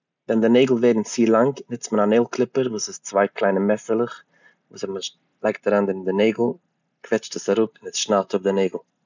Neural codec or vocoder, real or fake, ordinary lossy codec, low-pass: none; real; none; 7.2 kHz